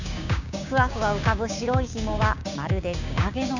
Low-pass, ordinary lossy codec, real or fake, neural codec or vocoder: 7.2 kHz; none; fake; codec, 16 kHz, 6 kbps, DAC